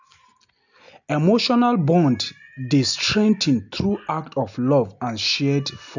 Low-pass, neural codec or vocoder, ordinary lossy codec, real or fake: 7.2 kHz; none; none; real